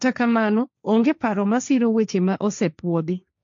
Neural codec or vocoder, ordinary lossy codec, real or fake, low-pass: codec, 16 kHz, 1.1 kbps, Voila-Tokenizer; MP3, 48 kbps; fake; 7.2 kHz